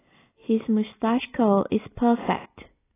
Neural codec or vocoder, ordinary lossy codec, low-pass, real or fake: none; AAC, 16 kbps; 3.6 kHz; real